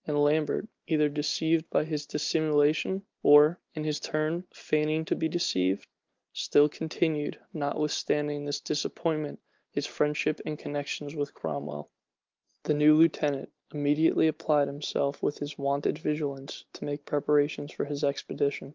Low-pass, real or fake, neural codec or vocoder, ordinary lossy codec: 7.2 kHz; real; none; Opus, 24 kbps